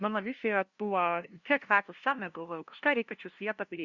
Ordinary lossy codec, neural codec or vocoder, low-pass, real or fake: Opus, 64 kbps; codec, 16 kHz, 0.5 kbps, FunCodec, trained on LibriTTS, 25 frames a second; 7.2 kHz; fake